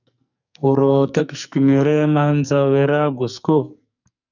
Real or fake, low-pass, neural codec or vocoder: fake; 7.2 kHz; codec, 44.1 kHz, 2.6 kbps, SNAC